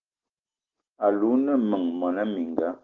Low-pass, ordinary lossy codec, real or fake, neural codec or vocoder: 7.2 kHz; Opus, 16 kbps; real; none